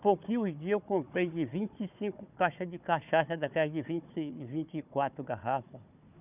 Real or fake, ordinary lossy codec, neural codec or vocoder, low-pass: fake; none; codec, 16 kHz, 4 kbps, FunCodec, trained on Chinese and English, 50 frames a second; 3.6 kHz